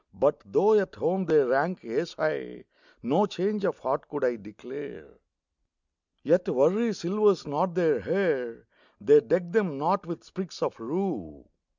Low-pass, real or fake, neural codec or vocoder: 7.2 kHz; real; none